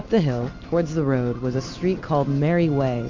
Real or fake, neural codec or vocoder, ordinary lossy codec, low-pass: real; none; MP3, 48 kbps; 7.2 kHz